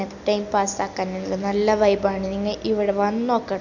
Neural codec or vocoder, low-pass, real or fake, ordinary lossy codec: none; 7.2 kHz; real; none